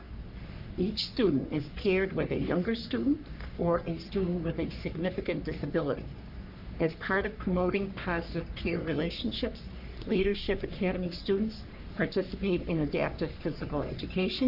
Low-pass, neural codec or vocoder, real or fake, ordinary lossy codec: 5.4 kHz; codec, 44.1 kHz, 3.4 kbps, Pupu-Codec; fake; AAC, 48 kbps